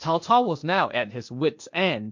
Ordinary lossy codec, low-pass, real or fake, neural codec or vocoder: MP3, 48 kbps; 7.2 kHz; fake; codec, 16 kHz, 0.8 kbps, ZipCodec